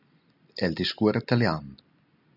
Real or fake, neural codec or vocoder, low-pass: real; none; 5.4 kHz